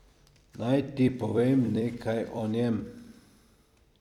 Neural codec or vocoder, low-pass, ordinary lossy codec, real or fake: vocoder, 44.1 kHz, 128 mel bands every 256 samples, BigVGAN v2; 19.8 kHz; none; fake